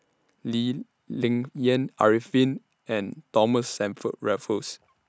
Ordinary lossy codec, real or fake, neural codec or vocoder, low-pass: none; real; none; none